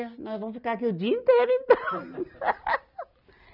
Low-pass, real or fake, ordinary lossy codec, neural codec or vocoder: 5.4 kHz; real; none; none